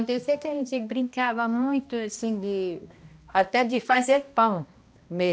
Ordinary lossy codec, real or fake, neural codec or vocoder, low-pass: none; fake; codec, 16 kHz, 1 kbps, X-Codec, HuBERT features, trained on balanced general audio; none